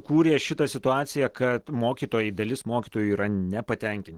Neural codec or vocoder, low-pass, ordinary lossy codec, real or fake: none; 19.8 kHz; Opus, 16 kbps; real